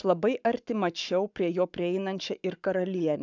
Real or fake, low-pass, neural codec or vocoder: fake; 7.2 kHz; codec, 44.1 kHz, 7.8 kbps, Pupu-Codec